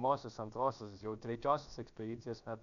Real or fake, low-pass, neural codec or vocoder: fake; 7.2 kHz; codec, 16 kHz, about 1 kbps, DyCAST, with the encoder's durations